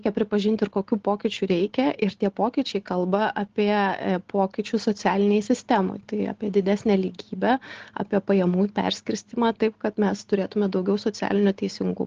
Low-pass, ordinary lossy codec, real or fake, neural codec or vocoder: 7.2 kHz; Opus, 16 kbps; real; none